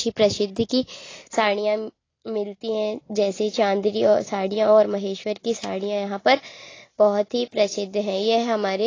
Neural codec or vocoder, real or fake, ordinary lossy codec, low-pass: none; real; AAC, 32 kbps; 7.2 kHz